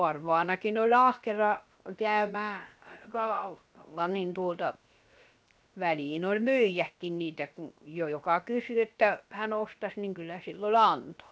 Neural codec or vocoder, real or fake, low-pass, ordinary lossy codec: codec, 16 kHz, 0.7 kbps, FocalCodec; fake; none; none